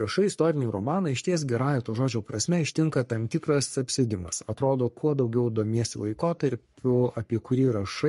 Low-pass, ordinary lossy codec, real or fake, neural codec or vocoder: 14.4 kHz; MP3, 48 kbps; fake; codec, 44.1 kHz, 3.4 kbps, Pupu-Codec